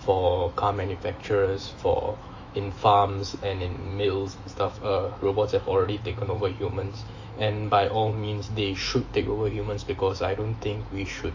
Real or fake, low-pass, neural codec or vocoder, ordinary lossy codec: fake; 7.2 kHz; autoencoder, 48 kHz, 128 numbers a frame, DAC-VAE, trained on Japanese speech; AAC, 48 kbps